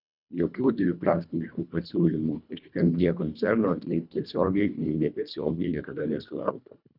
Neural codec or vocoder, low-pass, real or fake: codec, 24 kHz, 1.5 kbps, HILCodec; 5.4 kHz; fake